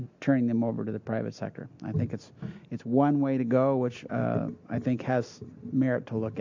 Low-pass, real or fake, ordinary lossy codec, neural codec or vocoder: 7.2 kHz; real; MP3, 64 kbps; none